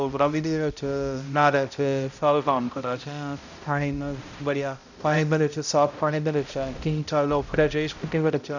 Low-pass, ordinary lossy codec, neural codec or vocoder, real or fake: 7.2 kHz; none; codec, 16 kHz, 0.5 kbps, X-Codec, HuBERT features, trained on balanced general audio; fake